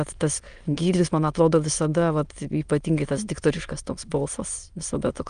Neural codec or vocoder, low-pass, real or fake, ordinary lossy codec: autoencoder, 22.05 kHz, a latent of 192 numbers a frame, VITS, trained on many speakers; 9.9 kHz; fake; Opus, 32 kbps